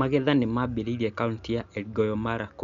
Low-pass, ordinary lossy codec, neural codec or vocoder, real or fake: 7.2 kHz; Opus, 64 kbps; none; real